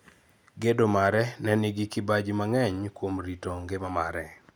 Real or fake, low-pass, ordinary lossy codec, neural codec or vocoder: real; none; none; none